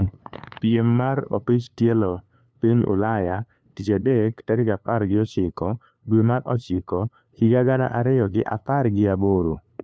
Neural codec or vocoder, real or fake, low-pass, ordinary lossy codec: codec, 16 kHz, 2 kbps, FunCodec, trained on LibriTTS, 25 frames a second; fake; none; none